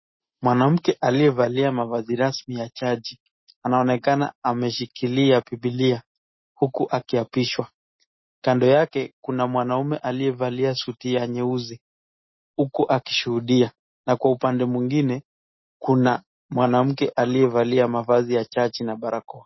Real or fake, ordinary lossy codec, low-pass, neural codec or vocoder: real; MP3, 24 kbps; 7.2 kHz; none